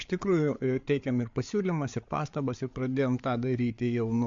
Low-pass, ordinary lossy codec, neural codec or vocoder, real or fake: 7.2 kHz; MP3, 48 kbps; codec, 16 kHz, 8 kbps, FreqCodec, larger model; fake